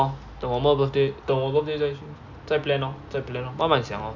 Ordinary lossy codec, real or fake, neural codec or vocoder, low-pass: none; real; none; 7.2 kHz